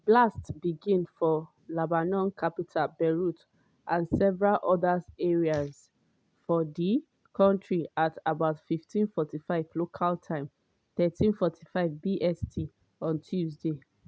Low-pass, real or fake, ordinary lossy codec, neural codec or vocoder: none; real; none; none